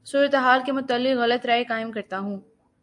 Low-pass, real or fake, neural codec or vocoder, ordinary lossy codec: 10.8 kHz; real; none; AAC, 64 kbps